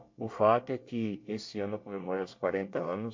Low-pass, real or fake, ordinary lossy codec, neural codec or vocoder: 7.2 kHz; fake; MP3, 48 kbps; codec, 24 kHz, 1 kbps, SNAC